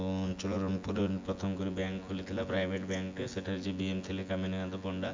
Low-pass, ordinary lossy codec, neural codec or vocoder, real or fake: 7.2 kHz; MP3, 64 kbps; vocoder, 24 kHz, 100 mel bands, Vocos; fake